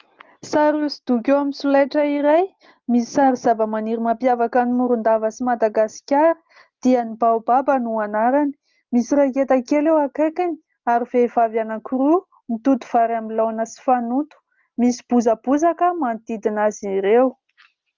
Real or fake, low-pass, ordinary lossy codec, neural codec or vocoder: real; 7.2 kHz; Opus, 32 kbps; none